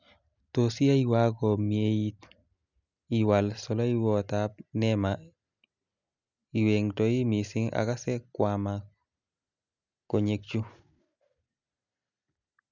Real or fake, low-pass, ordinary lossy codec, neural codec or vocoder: real; 7.2 kHz; none; none